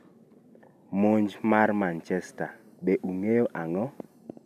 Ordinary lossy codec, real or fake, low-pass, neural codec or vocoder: none; real; 14.4 kHz; none